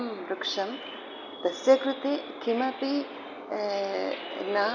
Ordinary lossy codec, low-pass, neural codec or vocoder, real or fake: none; 7.2 kHz; none; real